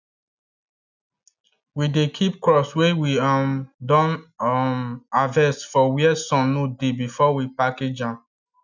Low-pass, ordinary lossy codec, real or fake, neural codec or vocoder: 7.2 kHz; none; real; none